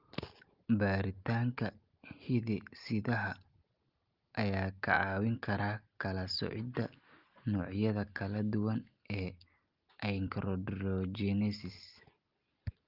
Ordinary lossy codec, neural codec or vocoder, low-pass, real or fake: Opus, 32 kbps; none; 5.4 kHz; real